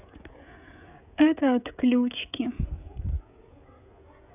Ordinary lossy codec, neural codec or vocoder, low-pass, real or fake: none; codec, 16 kHz, 16 kbps, FreqCodec, smaller model; 3.6 kHz; fake